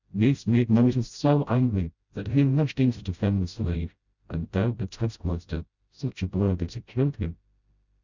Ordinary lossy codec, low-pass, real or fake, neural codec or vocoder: Opus, 64 kbps; 7.2 kHz; fake; codec, 16 kHz, 0.5 kbps, FreqCodec, smaller model